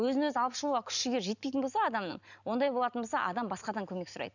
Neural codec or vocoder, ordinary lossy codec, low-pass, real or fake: none; none; 7.2 kHz; real